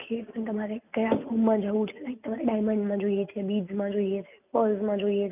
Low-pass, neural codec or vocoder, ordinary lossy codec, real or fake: 3.6 kHz; none; none; real